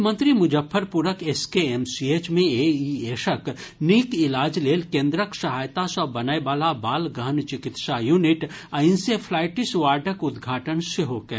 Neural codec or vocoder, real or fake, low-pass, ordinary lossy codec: none; real; none; none